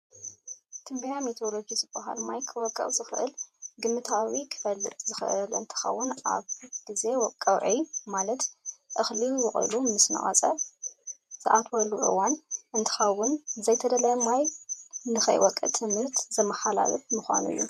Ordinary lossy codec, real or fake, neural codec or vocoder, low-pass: MP3, 64 kbps; fake; vocoder, 48 kHz, 128 mel bands, Vocos; 14.4 kHz